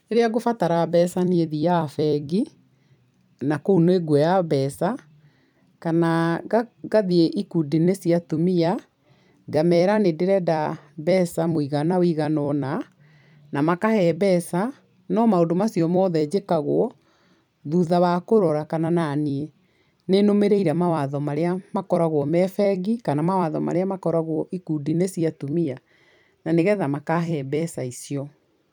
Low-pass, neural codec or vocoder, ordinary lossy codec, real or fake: 19.8 kHz; vocoder, 44.1 kHz, 128 mel bands every 256 samples, BigVGAN v2; none; fake